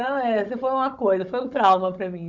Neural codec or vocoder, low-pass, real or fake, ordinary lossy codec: codec, 16 kHz, 16 kbps, FunCodec, trained on Chinese and English, 50 frames a second; 7.2 kHz; fake; none